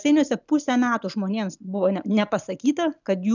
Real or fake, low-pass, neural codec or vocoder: real; 7.2 kHz; none